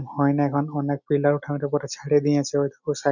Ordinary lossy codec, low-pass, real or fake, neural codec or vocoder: none; 7.2 kHz; real; none